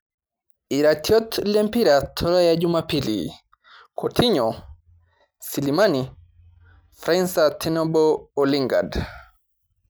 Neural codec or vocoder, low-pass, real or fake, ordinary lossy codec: none; none; real; none